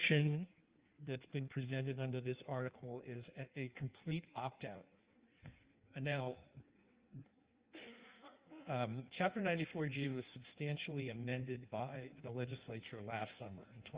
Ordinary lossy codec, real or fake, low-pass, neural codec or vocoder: Opus, 24 kbps; fake; 3.6 kHz; codec, 16 kHz in and 24 kHz out, 1.1 kbps, FireRedTTS-2 codec